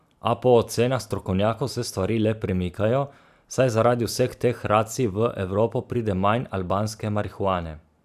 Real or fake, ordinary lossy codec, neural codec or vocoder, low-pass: fake; none; vocoder, 44.1 kHz, 128 mel bands every 512 samples, BigVGAN v2; 14.4 kHz